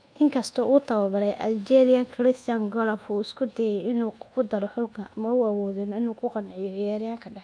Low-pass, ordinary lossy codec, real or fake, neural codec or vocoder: 9.9 kHz; none; fake; codec, 24 kHz, 1.2 kbps, DualCodec